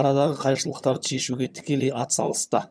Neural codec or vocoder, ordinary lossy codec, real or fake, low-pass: vocoder, 22.05 kHz, 80 mel bands, HiFi-GAN; none; fake; none